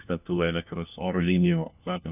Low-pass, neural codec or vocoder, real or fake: 3.6 kHz; codec, 16 kHz, 1 kbps, FunCodec, trained on LibriTTS, 50 frames a second; fake